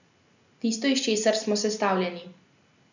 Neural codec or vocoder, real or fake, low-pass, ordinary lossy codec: none; real; 7.2 kHz; none